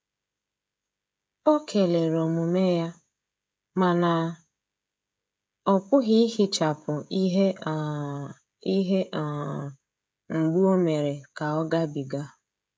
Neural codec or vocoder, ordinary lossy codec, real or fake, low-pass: codec, 16 kHz, 16 kbps, FreqCodec, smaller model; none; fake; none